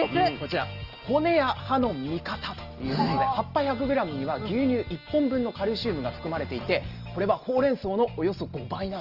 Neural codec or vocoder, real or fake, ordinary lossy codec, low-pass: none; real; Opus, 24 kbps; 5.4 kHz